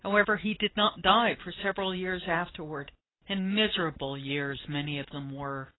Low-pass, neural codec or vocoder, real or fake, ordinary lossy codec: 7.2 kHz; none; real; AAC, 16 kbps